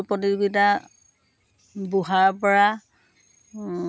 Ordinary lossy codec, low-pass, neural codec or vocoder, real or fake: none; none; none; real